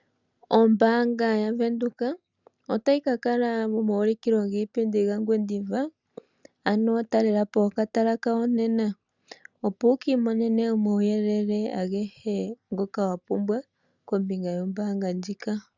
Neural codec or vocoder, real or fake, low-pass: none; real; 7.2 kHz